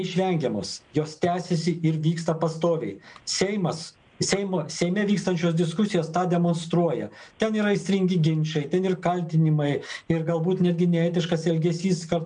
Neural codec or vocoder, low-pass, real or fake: none; 9.9 kHz; real